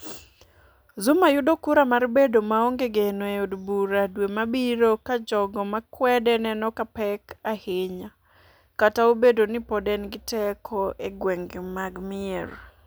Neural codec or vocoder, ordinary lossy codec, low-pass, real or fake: none; none; none; real